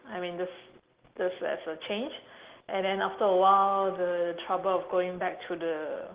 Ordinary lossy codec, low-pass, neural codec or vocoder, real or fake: Opus, 16 kbps; 3.6 kHz; none; real